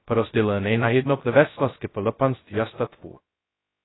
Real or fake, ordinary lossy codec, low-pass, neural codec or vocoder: fake; AAC, 16 kbps; 7.2 kHz; codec, 16 kHz, 0.2 kbps, FocalCodec